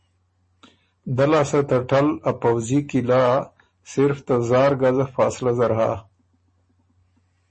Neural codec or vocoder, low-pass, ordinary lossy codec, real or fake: none; 9.9 kHz; MP3, 32 kbps; real